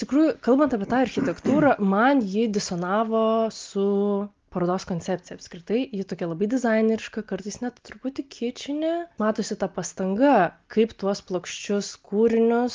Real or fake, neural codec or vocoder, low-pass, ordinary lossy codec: real; none; 7.2 kHz; Opus, 32 kbps